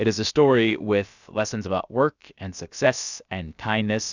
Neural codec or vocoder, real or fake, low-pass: codec, 16 kHz, about 1 kbps, DyCAST, with the encoder's durations; fake; 7.2 kHz